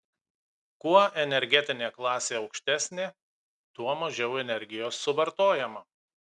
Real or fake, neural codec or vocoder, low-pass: real; none; 10.8 kHz